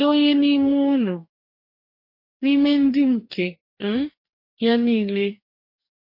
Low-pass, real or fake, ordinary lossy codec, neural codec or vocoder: 5.4 kHz; fake; MP3, 32 kbps; codec, 44.1 kHz, 2.6 kbps, DAC